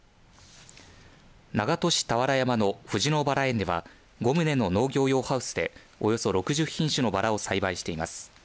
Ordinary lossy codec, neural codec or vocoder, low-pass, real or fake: none; none; none; real